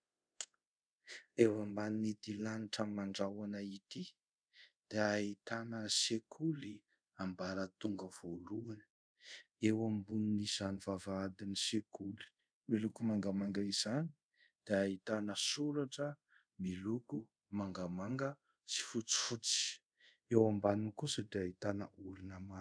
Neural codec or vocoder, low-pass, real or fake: codec, 24 kHz, 0.5 kbps, DualCodec; 9.9 kHz; fake